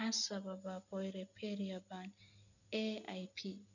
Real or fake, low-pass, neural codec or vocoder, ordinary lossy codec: real; 7.2 kHz; none; none